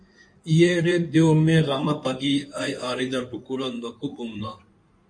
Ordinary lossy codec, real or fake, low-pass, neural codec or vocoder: MP3, 48 kbps; fake; 9.9 kHz; codec, 16 kHz in and 24 kHz out, 2.2 kbps, FireRedTTS-2 codec